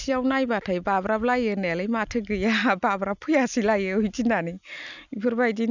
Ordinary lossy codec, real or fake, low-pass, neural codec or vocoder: none; real; 7.2 kHz; none